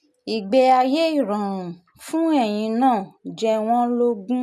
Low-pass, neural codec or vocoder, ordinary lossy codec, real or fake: 14.4 kHz; none; none; real